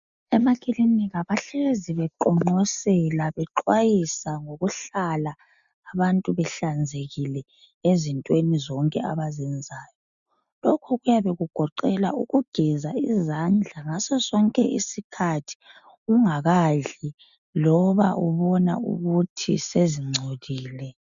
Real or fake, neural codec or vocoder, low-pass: real; none; 7.2 kHz